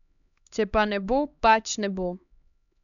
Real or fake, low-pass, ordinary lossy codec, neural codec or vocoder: fake; 7.2 kHz; none; codec, 16 kHz, 2 kbps, X-Codec, HuBERT features, trained on LibriSpeech